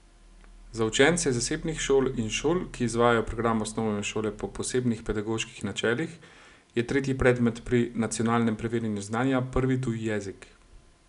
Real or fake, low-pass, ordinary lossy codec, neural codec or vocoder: real; 10.8 kHz; none; none